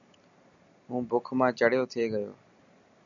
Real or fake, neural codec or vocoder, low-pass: real; none; 7.2 kHz